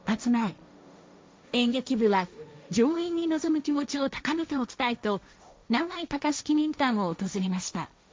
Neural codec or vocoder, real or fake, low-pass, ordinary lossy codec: codec, 16 kHz, 1.1 kbps, Voila-Tokenizer; fake; none; none